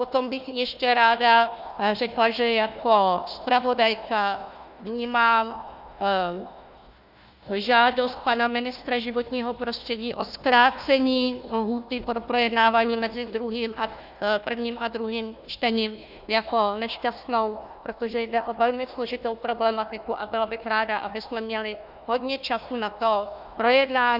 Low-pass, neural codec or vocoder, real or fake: 5.4 kHz; codec, 16 kHz, 1 kbps, FunCodec, trained on Chinese and English, 50 frames a second; fake